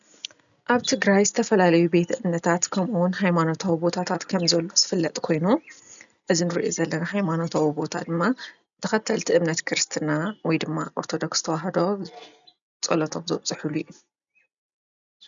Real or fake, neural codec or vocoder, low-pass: real; none; 7.2 kHz